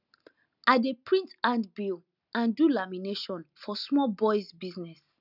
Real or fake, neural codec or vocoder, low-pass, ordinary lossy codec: real; none; 5.4 kHz; none